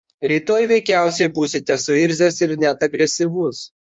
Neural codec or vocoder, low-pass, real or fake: codec, 16 kHz in and 24 kHz out, 1.1 kbps, FireRedTTS-2 codec; 9.9 kHz; fake